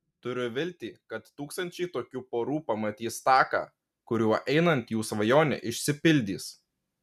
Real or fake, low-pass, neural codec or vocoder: real; 14.4 kHz; none